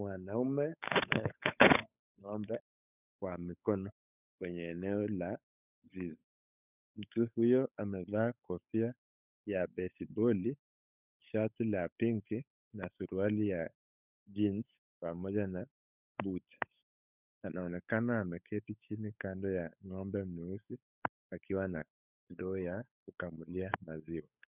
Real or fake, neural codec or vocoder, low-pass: fake; codec, 16 kHz, 8 kbps, FunCodec, trained on Chinese and English, 25 frames a second; 3.6 kHz